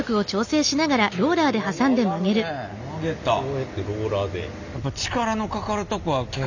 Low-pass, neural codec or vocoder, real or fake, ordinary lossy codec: 7.2 kHz; none; real; none